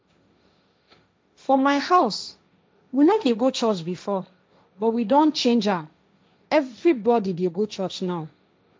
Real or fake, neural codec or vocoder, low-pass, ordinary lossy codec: fake; codec, 16 kHz, 1.1 kbps, Voila-Tokenizer; none; none